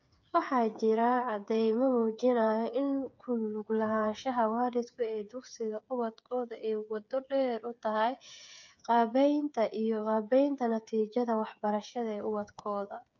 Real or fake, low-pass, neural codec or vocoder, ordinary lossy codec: fake; 7.2 kHz; codec, 16 kHz, 8 kbps, FreqCodec, smaller model; none